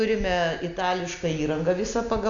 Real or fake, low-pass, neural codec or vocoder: real; 7.2 kHz; none